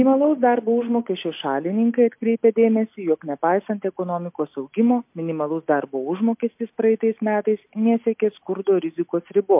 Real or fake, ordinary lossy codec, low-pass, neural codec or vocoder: real; MP3, 32 kbps; 3.6 kHz; none